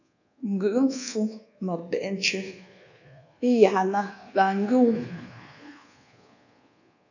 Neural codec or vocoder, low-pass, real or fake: codec, 24 kHz, 1.2 kbps, DualCodec; 7.2 kHz; fake